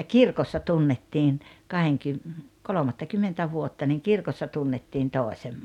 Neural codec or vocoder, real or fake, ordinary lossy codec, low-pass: none; real; none; 19.8 kHz